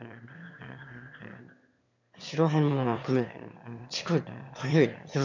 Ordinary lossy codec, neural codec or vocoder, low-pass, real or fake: none; autoencoder, 22.05 kHz, a latent of 192 numbers a frame, VITS, trained on one speaker; 7.2 kHz; fake